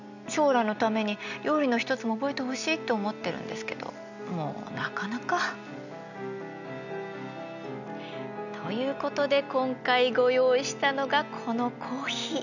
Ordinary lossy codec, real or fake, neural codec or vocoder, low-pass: none; real; none; 7.2 kHz